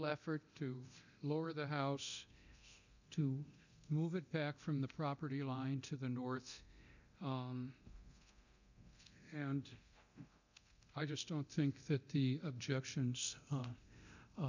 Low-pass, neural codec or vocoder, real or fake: 7.2 kHz; codec, 24 kHz, 0.9 kbps, DualCodec; fake